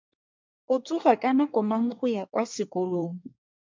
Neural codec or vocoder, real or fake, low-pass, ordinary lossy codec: codec, 24 kHz, 1 kbps, SNAC; fake; 7.2 kHz; MP3, 64 kbps